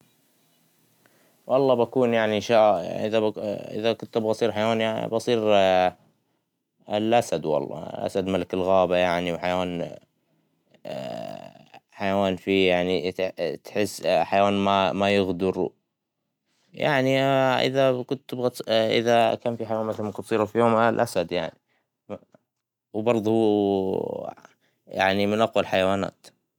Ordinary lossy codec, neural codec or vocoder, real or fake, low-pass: none; none; real; 19.8 kHz